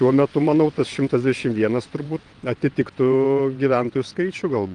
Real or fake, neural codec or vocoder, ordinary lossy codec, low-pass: fake; vocoder, 22.05 kHz, 80 mel bands, WaveNeXt; Opus, 24 kbps; 9.9 kHz